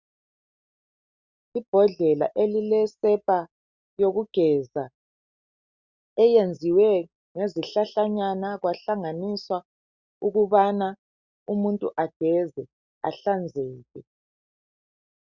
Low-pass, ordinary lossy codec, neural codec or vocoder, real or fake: 7.2 kHz; Opus, 64 kbps; none; real